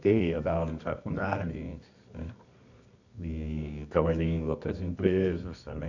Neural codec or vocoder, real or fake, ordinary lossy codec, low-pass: codec, 24 kHz, 0.9 kbps, WavTokenizer, medium music audio release; fake; none; 7.2 kHz